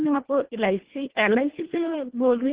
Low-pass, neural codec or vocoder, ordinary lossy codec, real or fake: 3.6 kHz; codec, 24 kHz, 1.5 kbps, HILCodec; Opus, 16 kbps; fake